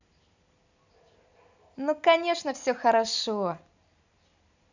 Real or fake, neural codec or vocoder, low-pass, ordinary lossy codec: real; none; 7.2 kHz; none